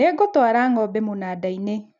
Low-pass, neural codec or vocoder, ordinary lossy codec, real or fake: 7.2 kHz; none; none; real